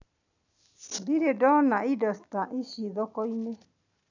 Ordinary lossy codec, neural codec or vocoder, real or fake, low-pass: none; none; real; 7.2 kHz